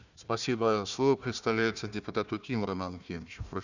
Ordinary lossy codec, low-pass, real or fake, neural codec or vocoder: none; 7.2 kHz; fake; codec, 16 kHz, 1 kbps, FunCodec, trained on Chinese and English, 50 frames a second